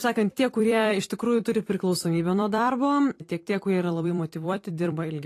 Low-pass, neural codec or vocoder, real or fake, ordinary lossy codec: 14.4 kHz; vocoder, 44.1 kHz, 128 mel bands every 256 samples, BigVGAN v2; fake; AAC, 48 kbps